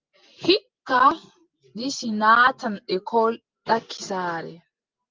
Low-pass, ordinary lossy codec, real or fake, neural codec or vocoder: 7.2 kHz; Opus, 32 kbps; real; none